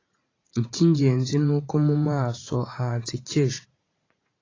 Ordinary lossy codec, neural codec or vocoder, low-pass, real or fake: AAC, 32 kbps; vocoder, 44.1 kHz, 80 mel bands, Vocos; 7.2 kHz; fake